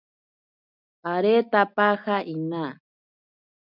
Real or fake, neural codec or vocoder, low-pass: real; none; 5.4 kHz